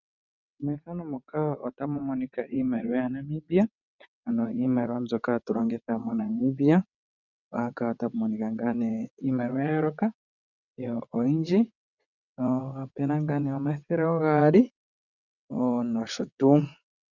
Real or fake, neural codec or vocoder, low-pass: fake; vocoder, 22.05 kHz, 80 mel bands, WaveNeXt; 7.2 kHz